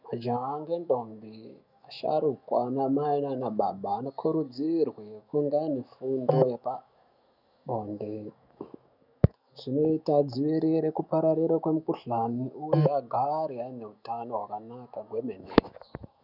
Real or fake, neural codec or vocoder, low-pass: fake; autoencoder, 48 kHz, 128 numbers a frame, DAC-VAE, trained on Japanese speech; 5.4 kHz